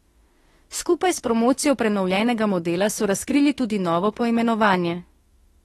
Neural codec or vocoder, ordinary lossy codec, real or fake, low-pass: autoencoder, 48 kHz, 32 numbers a frame, DAC-VAE, trained on Japanese speech; AAC, 32 kbps; fake; 19.8 kHz